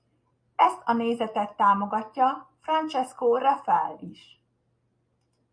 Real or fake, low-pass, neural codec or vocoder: real; 9.9 kHz; none